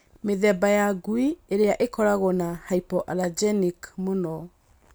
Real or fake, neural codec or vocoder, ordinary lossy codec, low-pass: real; none; none; none